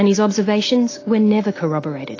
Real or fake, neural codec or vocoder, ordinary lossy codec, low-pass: real; none; AAC, 32 kbps; 7.2 kHz